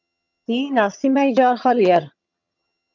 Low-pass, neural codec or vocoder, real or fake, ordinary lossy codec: 7.2 kHz; vocoder, 22.05 kHz, 80 mel bands, HiFi-GAN; fake; MP3, 64 kbps